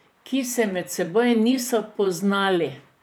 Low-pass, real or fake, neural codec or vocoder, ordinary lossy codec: none; fake; vocoder, 44.1 kHz, 128 mel bands, Pupu-Vocoder; none